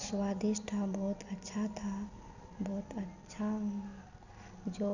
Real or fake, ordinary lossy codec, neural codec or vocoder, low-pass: real; none; none; 7.2 kHz